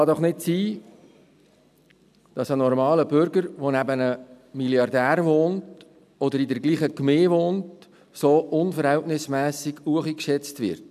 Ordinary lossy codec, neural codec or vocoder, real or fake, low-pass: none; none; real; 14.4 kHz